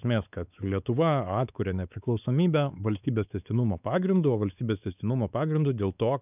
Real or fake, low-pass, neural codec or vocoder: fake; 3.6 kHz; codec, 16 kHz, 4 kbps, X-Codec, WavLM features, trained on Multilingual LibriSpeech